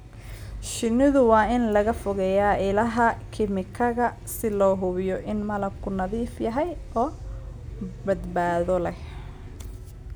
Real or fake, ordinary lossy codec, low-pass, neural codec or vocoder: real; none; none; none